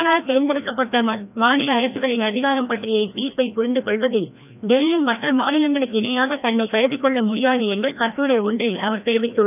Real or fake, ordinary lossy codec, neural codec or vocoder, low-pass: fake; none; codec, 16 kHz, 1 kbps, FreqCodec, larger model; 3.6 kHz